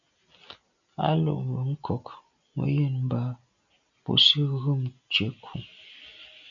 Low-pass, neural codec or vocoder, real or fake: 7.2 kHz; none; real